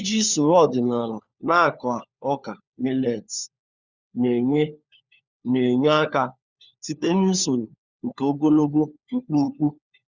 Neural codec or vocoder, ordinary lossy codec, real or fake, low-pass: codec, 16 kHz, 4 kbps, FunCodec, trained on LibriTTS, 50 frames a second; Opus, 64 kbps; fake; 7.2 kHz